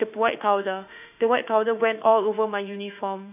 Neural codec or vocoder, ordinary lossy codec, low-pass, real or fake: autoencoder, 48 kHz, 32 numbers a frame, DAC-VAE, trained on Japanese speech; none; 3.6 kHz; fake